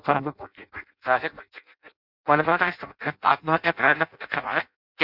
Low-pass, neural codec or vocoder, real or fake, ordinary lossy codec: 5.4 kHz; codec, 16 kHz in and 24 kHz out, 0.6 kbps, FireRedTTS-2 codec; fake; Opus, 64 kbps